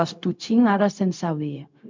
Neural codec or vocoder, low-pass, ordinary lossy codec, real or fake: codec, 16 kHz, 0.4 kbps, LongCat-Audio-Codec; 7.2 kHz; MP3, 64 kbps; fake